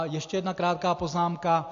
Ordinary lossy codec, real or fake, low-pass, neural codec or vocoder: AAC, 48 kbps; real; 7.2 kHz; none